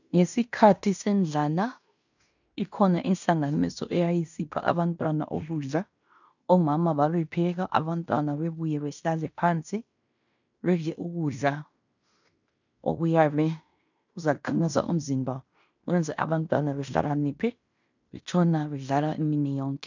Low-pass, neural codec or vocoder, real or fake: 7.2 kHz; codec, 16 kHz in and 24 kHz out, 0.9 kbps, LongCat-Audio-Codec, fine tuned four codebook decoder; fake